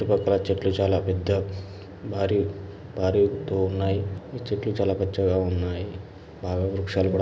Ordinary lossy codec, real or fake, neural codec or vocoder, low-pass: none; real; none; none